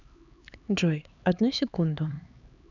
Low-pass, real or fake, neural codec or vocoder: 7.2 kHz; fake; codec, 16 kHz, 4 kbps, X-Codec, HuBERT features, trained on LibriSpeech